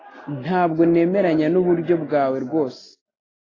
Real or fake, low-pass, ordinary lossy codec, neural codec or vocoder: real; 7.2 kHz; AAC, 32 kbps; none